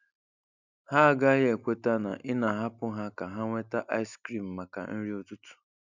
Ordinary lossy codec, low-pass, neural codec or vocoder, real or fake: none; 7.2 kHz; none; real